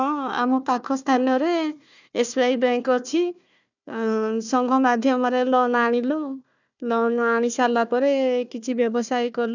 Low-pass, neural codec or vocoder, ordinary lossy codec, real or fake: 7.2 kHz; codec, 16 kHz, 1 kbps, FunCodec, trained on Chinese and English, 50 frames a second; none; fake